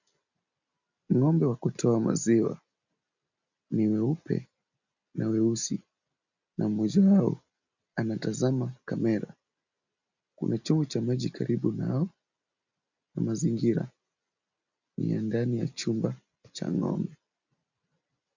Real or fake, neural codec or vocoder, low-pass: real; none; 7.2 kHz